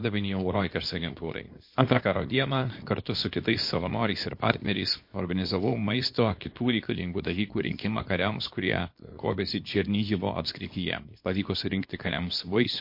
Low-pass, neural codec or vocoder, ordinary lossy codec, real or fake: 5.4 kHz; codec, 24 kHz, 0.9 kbps, WavTokenizer, small release; MP3, 32 kbps; fake